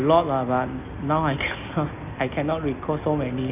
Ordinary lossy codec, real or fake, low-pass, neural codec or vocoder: none; real; 3.6 kHz; none